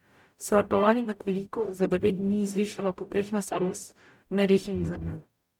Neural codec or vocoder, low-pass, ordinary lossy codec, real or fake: codec, 44.1 kHz, 0.9 kbps, DAC; 19.8 kHz; MP3, 96 kbps; fake